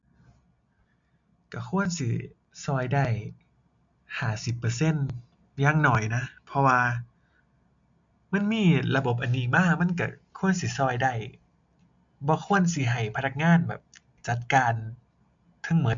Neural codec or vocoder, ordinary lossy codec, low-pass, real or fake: none; MP3, 64 kbps; 7.2 kHz; real